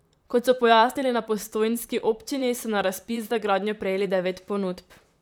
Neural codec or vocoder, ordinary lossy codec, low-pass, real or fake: vocoder, 44.1 kHz, 128 mel bands, Pupu-Vocoder; none; none; fake